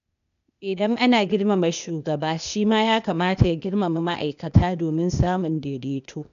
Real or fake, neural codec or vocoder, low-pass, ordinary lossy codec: fake; codec, 16 kHz, 0.8 kbps, ZipCodec; 7.2 kHz; none